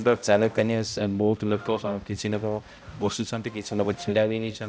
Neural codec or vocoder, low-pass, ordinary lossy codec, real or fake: codec, 16 kHz, 0.5 kbps, X-Codec, HuBERT features, trained on balanced general audio; none; none; fake